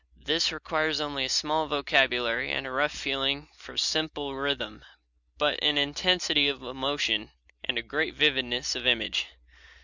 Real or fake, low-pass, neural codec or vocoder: real; 7.2 kHz; none